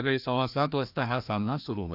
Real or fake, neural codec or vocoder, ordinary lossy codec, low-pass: fake; codec, 16 kHz, 1 kbps, FreqCodec, larger model; none; 5.4 kHz